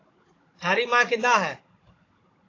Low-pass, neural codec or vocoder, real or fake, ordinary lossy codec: 7.2 kHz; codec, 16 kHz, 16 kbps, FunCodec, trained on Chinese and English, 50 frames a second; fake; AAC, 32 kbps